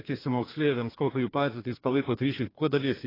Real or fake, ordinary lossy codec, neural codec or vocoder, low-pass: fake; AAC, 24 kbps; codec, 32 kHz, 1.9 kbps, SNAC; 5.4 kHz